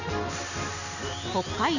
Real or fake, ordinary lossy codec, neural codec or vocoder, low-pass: real; none; none; 7.2 kHz